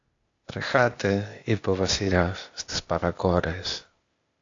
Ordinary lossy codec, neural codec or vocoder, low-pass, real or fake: AAC, 48 kbps; codec, 16 kHz, 0.8 kbps, ZipCodec; 7.2 kHz; fake